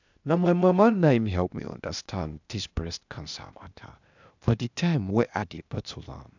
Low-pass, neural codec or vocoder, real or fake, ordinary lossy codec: 7.2 kHz; codec, 16 kHz, 0.8 kbps, ZipCodec; fake; none